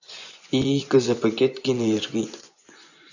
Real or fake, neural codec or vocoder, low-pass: fake; vocoder, 24 kHz, 100 mel bands, Vocos; 7.2 kHz